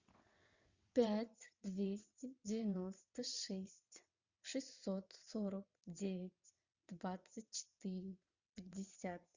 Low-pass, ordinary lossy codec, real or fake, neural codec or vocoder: 7.2 kHz; Opus, 64 kbps; fake; vocoder, 22.05 kHz, 80 mel bands, WaveNeXt